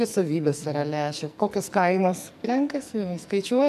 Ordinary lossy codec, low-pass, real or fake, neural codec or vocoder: MP3, 96 kbps; 14.4 kHz; fake; codec, 44.1 kHz, 2.6 kbps, SNAC